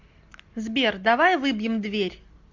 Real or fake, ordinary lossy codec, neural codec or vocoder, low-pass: real; MP3, 64 kbps; none; 7.2 kHz